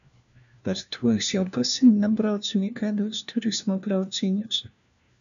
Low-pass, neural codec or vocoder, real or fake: 7.2 kHz; codec, 16 kHz, 1 kbps, FunCodec, trained on LibriTTS, 50 frames a second; fake